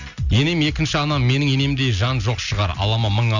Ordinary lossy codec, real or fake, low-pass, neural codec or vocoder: none; real; 7.2 kHz; none